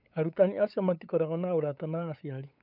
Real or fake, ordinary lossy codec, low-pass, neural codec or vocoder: fake; none; 5.4 kHz; codec, 16 kHz, 8 kbps, FunCodec, trained on LibriTTS, 25 frames a second